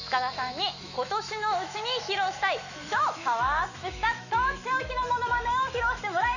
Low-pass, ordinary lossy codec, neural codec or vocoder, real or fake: 7.2 kHz; none; autoencoder, 48 kHz, 128 numbers a frame, DAC-VAE, trained on Japanese speech; fake